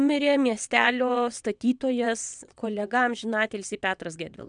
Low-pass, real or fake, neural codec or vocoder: 9.9 kHz; fake; vocoder, 22.05 kHz, 80 mel bands, WaveNeXt